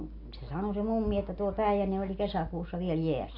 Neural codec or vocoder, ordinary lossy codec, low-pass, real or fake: none; AAC, 32 kbps; 5.4 kHz; real